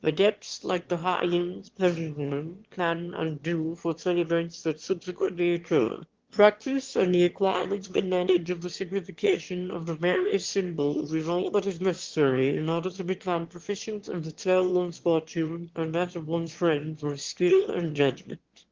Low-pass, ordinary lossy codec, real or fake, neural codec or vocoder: 7.2 kHz; Opus, 16 kbps; fake; autoencoder, 22.05 kHz, a latent of 192 numbers a frame, VITS, trained on one speaker